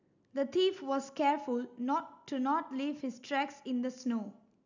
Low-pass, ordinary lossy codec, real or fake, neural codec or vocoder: 7.2 kHz; none; real; none